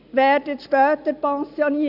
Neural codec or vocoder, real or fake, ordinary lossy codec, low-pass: none; real; none; 5.4 kHz